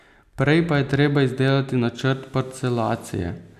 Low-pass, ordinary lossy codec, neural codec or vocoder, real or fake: 14.4 kHz; none; none; real